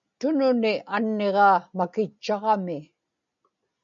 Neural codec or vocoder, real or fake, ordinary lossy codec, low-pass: none; real; MP3, 96 kbps; 7.2 kHz